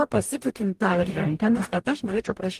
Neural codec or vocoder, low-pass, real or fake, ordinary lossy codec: codec, 44.1 kHz, 0.9 kbps, DAC; 14.4 kHz; fake; Opus, 24 kbps